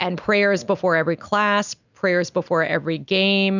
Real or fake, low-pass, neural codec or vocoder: real; 7.2 kHz; none